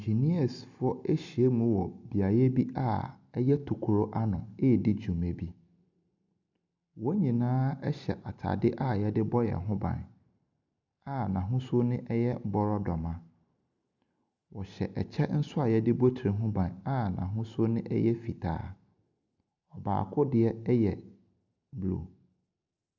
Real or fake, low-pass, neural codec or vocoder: real; 7.2 kHz; none